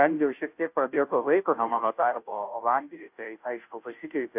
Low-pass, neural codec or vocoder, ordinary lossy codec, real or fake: 3.6 kHz; codec, 16 kHz, 0.5 kbps, FunCodec, trained on Chinese and English, 25 frames a second; AAC, 32 kbps; fake